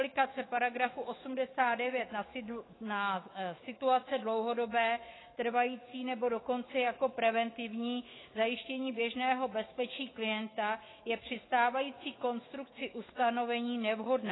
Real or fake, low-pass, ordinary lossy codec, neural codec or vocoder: fake; 7.2 kHz; AAC, 16 kbps; autoencoder, 48 kHz, 128 numbers a frame, DAC-VAE, trained on Japanese speech